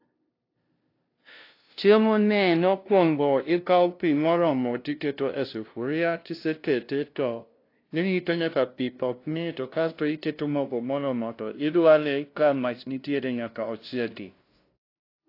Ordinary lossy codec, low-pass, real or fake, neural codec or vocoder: AAC, 32 kbps; 5.4 kHz; fake; codec, 16 kHz, 0.5 kbps, FunCodec, trained on LibriTTS, 25 frames a second